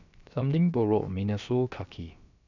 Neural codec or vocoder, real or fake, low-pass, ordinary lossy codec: codec, 16 kHz, about 1 kbps, DyCAST, with the encoder's durations; fake; 7.2 kHz; none